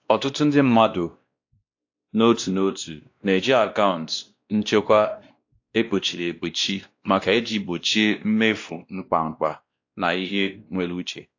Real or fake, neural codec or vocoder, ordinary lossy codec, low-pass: fake; codec, 16 kHz, 1 kbps, X-Codec, WavLM features, trained on Multilingual LibriSpeech; AAC, 48 kbps; 7.2 kHz